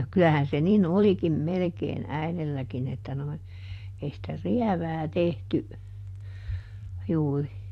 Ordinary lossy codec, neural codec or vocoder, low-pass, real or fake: AAC, 64 kbps; vocoder, 44.1 kHz, 128 mel bands every 512 samples, BigVGAN v2; 14.4 kHz; fake